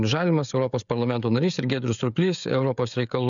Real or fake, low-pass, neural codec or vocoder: fake; 7.2 kHz; codec, 16 kHz, 16 kbps, FreqCodec, smaller model